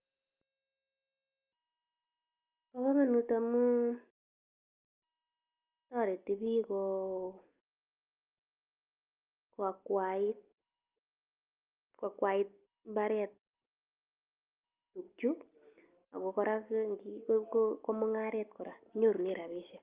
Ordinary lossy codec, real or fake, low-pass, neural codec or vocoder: Opus, 32 kbps; real; 3.6 kHz; none